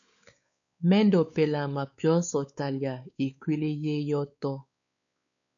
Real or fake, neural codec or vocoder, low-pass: fake; codec, 16 kHz, 4 kbps, X-Codec, WavLM features, trained on Multilingual LibriSpeech; 7.2 kHz